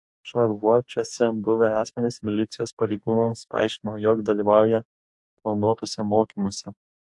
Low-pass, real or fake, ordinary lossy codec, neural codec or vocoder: 10.8 kHz; fake; AAC, 64 kbps; codec, 44.1 kHz, 2.6 kbps, DAC